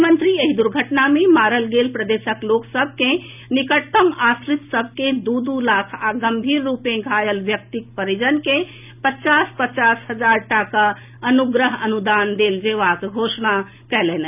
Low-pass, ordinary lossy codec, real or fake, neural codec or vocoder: 3.6 kHz; none; real; none